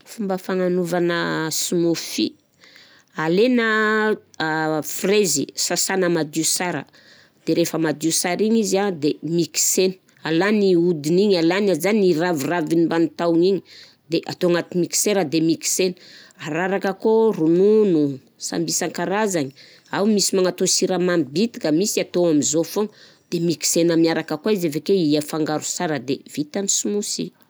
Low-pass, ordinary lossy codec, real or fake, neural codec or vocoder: none; none; real; none